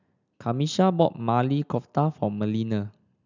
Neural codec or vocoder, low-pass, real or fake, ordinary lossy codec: none; 7.2 kHz; real; none